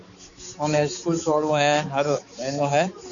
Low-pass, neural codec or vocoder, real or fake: 7.2 kHz; codec, 16 kHz, 4 kbps, X-Codec, HuBERT features, trained on balanced general audio; fake